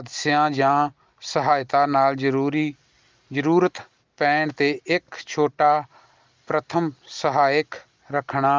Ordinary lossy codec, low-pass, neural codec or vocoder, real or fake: Opus, 24 kbps; 7.2 kHz; none; real